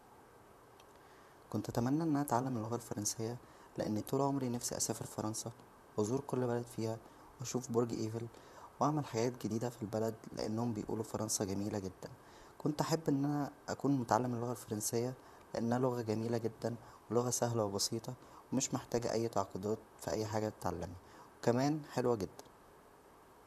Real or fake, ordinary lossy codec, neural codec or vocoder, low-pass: fake; none; vocoder, 44.1 kHz, 128 mel bands, Pupu-Vocoder; 14.4 kHz